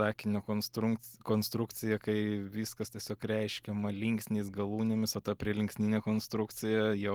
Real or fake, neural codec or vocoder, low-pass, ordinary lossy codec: real; none; 19.8 kHz; Opus, 24 kbps